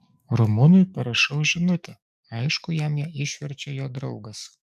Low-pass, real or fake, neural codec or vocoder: 14.4 kHz; fake; codec, 44.1 kHz, 7.8 kbps, DAC